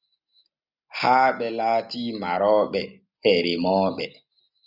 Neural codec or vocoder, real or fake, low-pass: none; real; 5.4 kHz